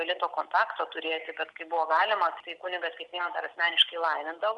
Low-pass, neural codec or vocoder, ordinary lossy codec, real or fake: 10.8 kHz; none; Opus, 64 kbps; real